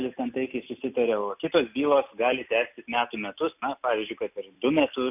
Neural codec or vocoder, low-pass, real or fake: none; 3.6 kHz; real